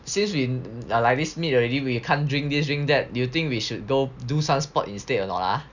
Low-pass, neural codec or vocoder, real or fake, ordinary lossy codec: 7.2 kHz; none; real; none